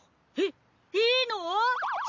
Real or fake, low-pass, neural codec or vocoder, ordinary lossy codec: real; 7.2 kHz; none; none